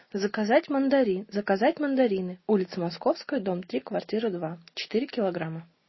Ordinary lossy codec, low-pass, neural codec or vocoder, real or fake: MP3, 24 kbps; 7.2 kHz; none; real